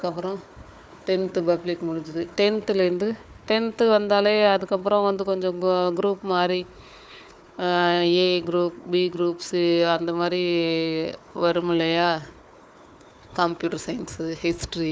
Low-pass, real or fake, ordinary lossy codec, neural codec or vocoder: none; fake; none; codec, 16 kHz, 4 kbps, FunCodec, trained on Chinese and English, 50 frames a second